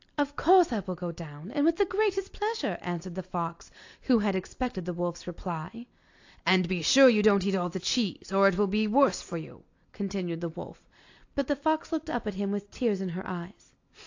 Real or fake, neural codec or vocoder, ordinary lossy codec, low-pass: real; none; AAC, 48 kbps; 7.2 kHz